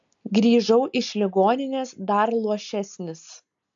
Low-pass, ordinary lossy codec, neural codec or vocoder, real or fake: 7.2 kHz; AAC, 64 kbps; none; real